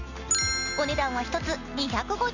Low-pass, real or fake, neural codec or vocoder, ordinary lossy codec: 7.2 kHz; real; none; none